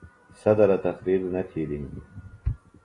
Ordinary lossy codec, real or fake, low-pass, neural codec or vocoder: AAC, 32 kbps; real; 10.8 kHz; none